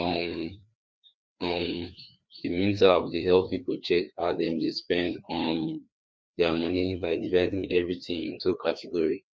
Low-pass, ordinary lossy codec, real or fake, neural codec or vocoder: none; none; fake; codec, 16 kHz, 2 kbps, FreqCodec, larger model